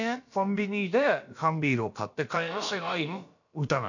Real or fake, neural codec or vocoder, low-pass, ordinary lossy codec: fake; codec, 16 kHz, about 1 kbps, DyCAST, with the encoder's durations; 7.2 kHz; none